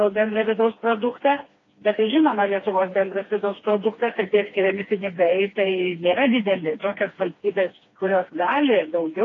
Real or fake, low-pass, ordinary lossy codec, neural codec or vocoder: fake; 7.2 kHz; AAC, 32 kbps; codec, 16 kHz, 2 kbps, FreqCodec, smaller model